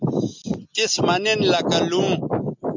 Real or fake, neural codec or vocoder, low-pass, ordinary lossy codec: real; none; 7.2 kHz; MP3, 64 kbps